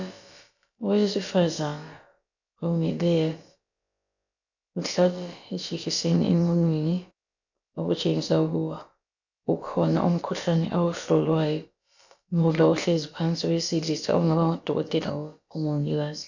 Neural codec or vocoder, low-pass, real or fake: codec, 16 kHz, about 1 kbps, DyCAST, with the encoder's durations; 7.2 kHz; fake